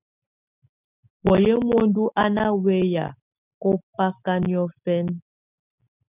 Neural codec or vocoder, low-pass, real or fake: none; 3.6 kHz; real